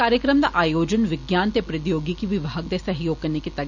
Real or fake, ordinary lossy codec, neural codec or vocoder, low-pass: real; none; none; 7.2 kHz